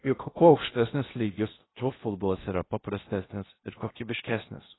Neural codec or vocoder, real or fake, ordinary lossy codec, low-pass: codec, 16 kHz in and 24 kHz out, 0.8 kbps, FocalCodec, streaming, 65536 codes; fake; AAC, 16 kbps; 7.2 kHz